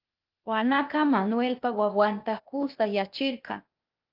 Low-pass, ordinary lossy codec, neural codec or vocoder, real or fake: 5.4 kHz; Opus, 24 kbps; codec, 16 kHz, 0.8 kbps, ZipCodec; fake